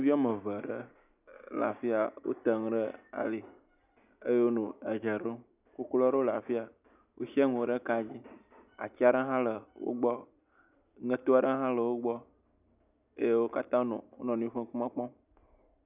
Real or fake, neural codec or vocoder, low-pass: real; none; 3.6 kHz